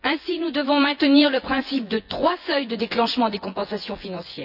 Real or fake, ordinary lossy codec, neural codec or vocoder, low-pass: fake; none; vocoder, 24 kHz, 100 mel bands, Vocos; 5.4 kHz